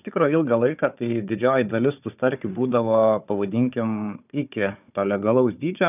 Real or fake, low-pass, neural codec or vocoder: fake; 3.6 kHz; codec, 16 kHz, 4 kbps, FunCodec, trained on Chinese and English, 50 frames a second